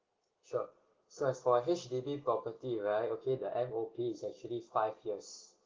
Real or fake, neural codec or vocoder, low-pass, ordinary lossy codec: real; none; 7.2 kHz; Opus, 16 kbps